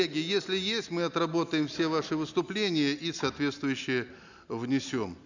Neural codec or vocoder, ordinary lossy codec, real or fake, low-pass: none; none; real; 7.2 kHz